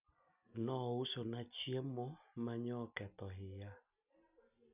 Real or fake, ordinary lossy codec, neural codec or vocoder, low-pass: real; none; none; 3.6 kHz